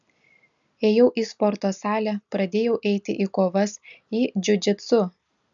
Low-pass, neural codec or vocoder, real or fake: 7.2 kHz; none; real